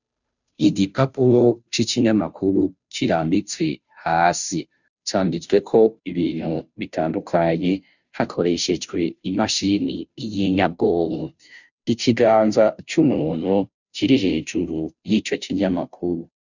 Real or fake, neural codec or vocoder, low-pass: fake; codec, 16 kHz, 0.5 kbps, FunCodec, trained on Chinese and English, 25 frames a second; 7.2 kHz